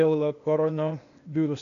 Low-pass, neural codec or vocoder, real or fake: 7.2 kHz; codec, 16 kHz, 0.8 kbps, ZipCodec; fake